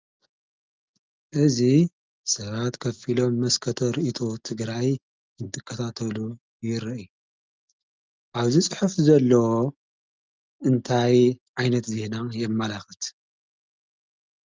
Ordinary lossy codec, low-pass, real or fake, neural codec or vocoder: Opus, 24 kbps; 7.2 kHz; real; none